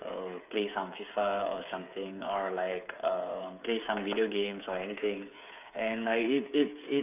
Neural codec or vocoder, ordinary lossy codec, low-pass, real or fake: codec, 16 kHz, 8 kbps, FreqCodec, smaller model; none; 3.6 kHz; fake